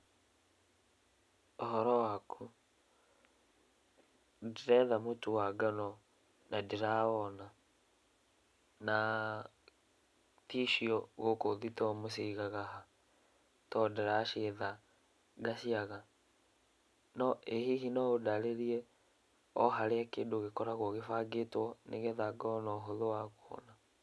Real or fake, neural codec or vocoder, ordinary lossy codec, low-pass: real; none; none; none